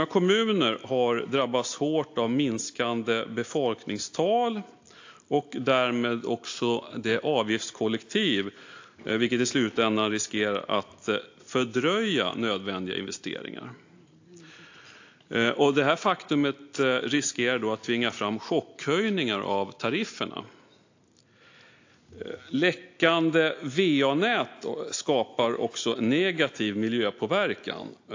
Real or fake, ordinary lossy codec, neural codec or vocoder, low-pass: real; AAC, 48 kbps; none; 7.2 kHz